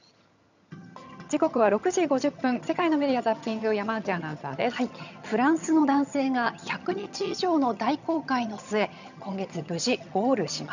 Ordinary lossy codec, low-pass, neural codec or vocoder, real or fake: none; 7.2 kHz; vocoder, 22.05 kHz, 80 mel bands, HiFi-GAN; fake